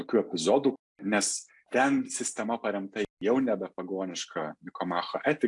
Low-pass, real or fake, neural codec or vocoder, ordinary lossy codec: 10.8 kHz; real; none; AAC, 64 kbps